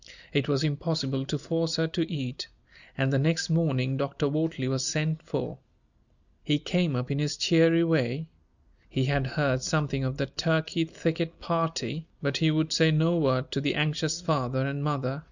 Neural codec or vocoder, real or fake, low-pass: none; real; 7.2 kHz